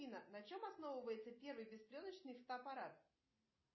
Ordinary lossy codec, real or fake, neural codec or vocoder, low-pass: MP3, 24 kbps; real; none; 7.2 kHz